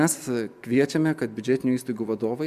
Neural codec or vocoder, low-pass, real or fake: none; 14.4 kHz; real